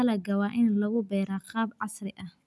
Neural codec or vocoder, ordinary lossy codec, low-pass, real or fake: none; none; none; real